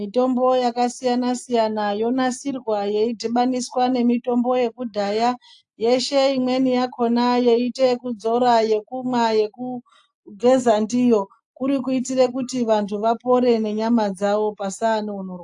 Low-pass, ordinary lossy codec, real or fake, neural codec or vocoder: 10.8 kHz; AAC, 64 kbps; real; none